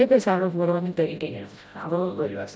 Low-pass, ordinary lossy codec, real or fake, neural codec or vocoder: none; none; fake; codec, 16 kHz, 0.5 kbps, FreqCodec, smaller model